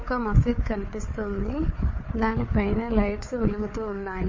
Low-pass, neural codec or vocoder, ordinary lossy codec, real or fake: 7.2 kHz; codec, 16 kHz, 4 kbps, FunCodec, trained on Chinese and English, 50 frames a second; MP3, 32 kbps; fake